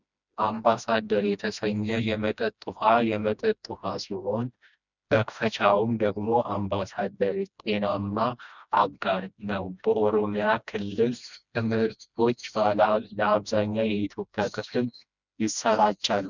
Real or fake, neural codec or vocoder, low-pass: fake; codec, 16 kHz, 1 kbps, FreqCodec, smaller model; 7.2 kHz